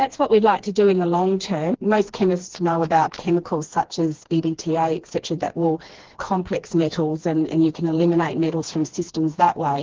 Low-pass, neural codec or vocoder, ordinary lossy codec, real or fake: 7.2 kHz; codec, 16 kHz, 2 kbps, FreqCodec, smaller model; Opus, 16 kbps; fake